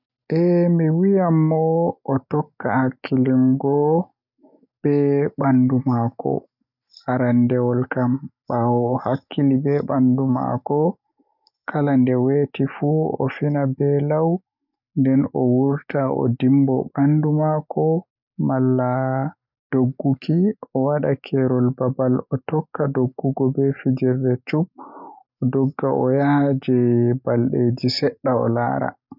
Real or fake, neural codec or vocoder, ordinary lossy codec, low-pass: real; none; none; 5.4 kHz